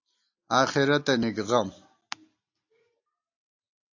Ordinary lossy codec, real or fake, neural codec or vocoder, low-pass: AAC, 48 kbps; real; none; 7.2 kHz